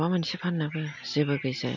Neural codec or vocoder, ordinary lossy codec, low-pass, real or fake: none; none; 7.2 kHz; real